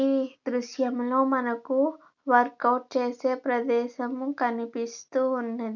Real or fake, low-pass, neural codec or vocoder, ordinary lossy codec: real; 7.2 kHz; none; none